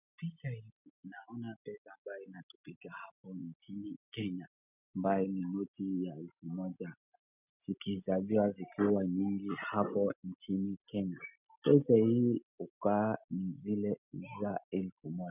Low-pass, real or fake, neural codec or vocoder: 3.6 kHz; real; none